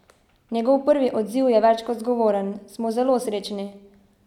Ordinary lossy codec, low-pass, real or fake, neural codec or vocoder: none; 19.8 kHz; real; none